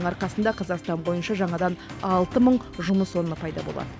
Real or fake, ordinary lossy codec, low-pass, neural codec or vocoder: real; none; none; none